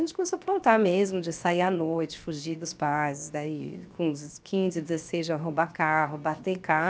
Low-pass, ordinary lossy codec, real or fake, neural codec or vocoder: none; none; fake; codec, 16 kHz, 0.7 kbps, FocalCodec